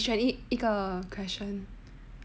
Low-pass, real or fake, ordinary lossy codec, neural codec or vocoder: none; real; none; none